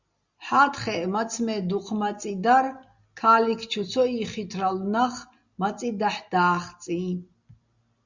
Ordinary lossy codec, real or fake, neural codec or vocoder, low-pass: Opus, 64 kbps; real; none; 7.2 kHz